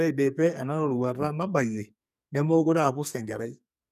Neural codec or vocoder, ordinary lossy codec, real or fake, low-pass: codec, 44.1 kHz, 2.6 kbps, SNAC; none; fake; 14.4 kHz